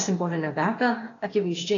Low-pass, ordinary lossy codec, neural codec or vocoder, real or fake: 7.2 kHz; AAC, 32 kbps; codec, 16 kHz, 0.8 kbps, ZipCodec; fake